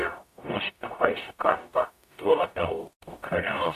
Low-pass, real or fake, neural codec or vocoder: 14.4 kHz; fake; codec, 44.1 kHz, 0.9 kbps, DAC